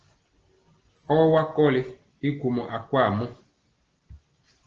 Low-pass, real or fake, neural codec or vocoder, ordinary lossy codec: 7.2 kHz; real; none; Opus, 16 kbps